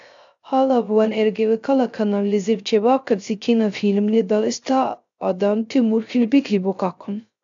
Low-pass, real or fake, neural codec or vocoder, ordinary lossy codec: 7.2 kHz; fake; codec, 16 kHz, 0.3 kbps, FocalCodec; AAC, 64 kbps